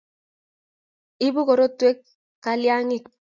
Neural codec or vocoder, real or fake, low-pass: none; real; 7.2 kHz